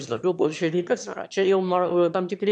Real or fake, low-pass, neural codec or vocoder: fake; 9.9 kHz; autoencoder, 22.05 kHz, a latent of 192 numbers a frame, VITS, trained on one speaker